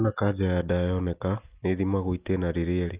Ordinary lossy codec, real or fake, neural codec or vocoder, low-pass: Opus, 32 kbps; real; none; 3.6 kHz